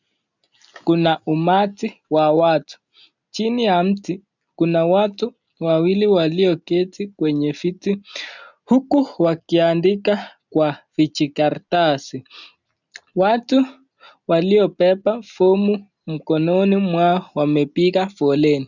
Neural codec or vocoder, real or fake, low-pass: none; real; 7.2 kHz